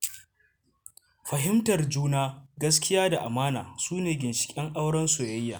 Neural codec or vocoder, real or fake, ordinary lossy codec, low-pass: none; real; none; none